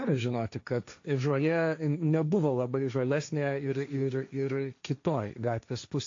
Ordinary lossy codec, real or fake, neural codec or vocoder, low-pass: AAC, 48 kbps; fake; codec, 16 kHz, 1.1 kbps, Voila-Tokenizer; 7.2 kHz